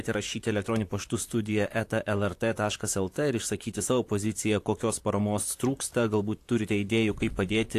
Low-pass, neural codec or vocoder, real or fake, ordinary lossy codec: 14.4 kHz; vocoder, 44.1 kHz, 128 mel bands, Pupu-Vocoder; fake; AAC, 64 kbps